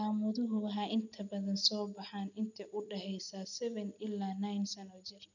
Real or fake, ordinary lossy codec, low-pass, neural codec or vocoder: real; none; 7.2 kHz; none